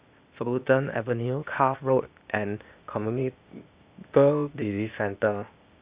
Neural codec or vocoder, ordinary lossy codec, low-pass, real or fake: codec, 16 kHz, 0.8 kbps, ZipCodec; Opus, 64 kbps; 3.6 kHz; fake